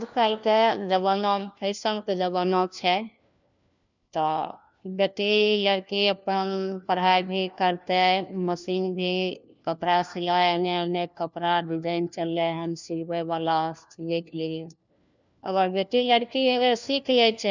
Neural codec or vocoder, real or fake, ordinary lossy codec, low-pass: codec, 16 kHz, 1 kbps, FunCodec, trained on LibriTTS, 50 frames a second; fake; none; 7.2 kHz